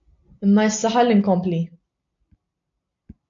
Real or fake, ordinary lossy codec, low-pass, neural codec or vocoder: real; AAC, 64 kbps; 7.2 kHz; none